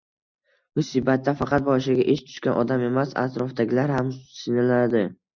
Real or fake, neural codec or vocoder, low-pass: real; none; 7.2 kHz